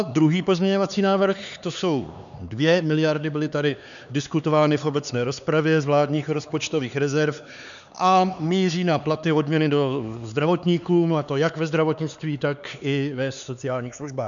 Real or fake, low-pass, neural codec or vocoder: fake; 7.2 kHz; codec, 16 kHz, 4 kbps, X-Codec, HuBERT features, trained on LibriSpeech